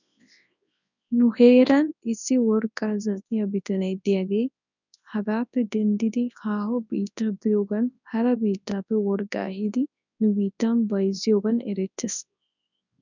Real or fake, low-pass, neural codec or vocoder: fake; 7.2 kHz; codec, 24 kHz, 0.9 kbps, WavTokenizer, large speech release